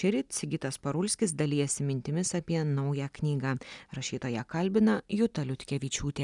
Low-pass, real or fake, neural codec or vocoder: 10.8 kHz; real; none